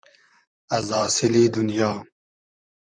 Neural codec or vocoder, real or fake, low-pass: vocoder, 44.1 kHz, 128 mel bands, Pupu-Vocoder; fake; 9.9 kHz